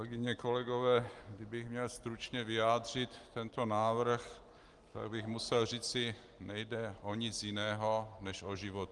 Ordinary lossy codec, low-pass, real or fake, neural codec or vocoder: Opus, 24 kbps; 10.8 kHz; real; none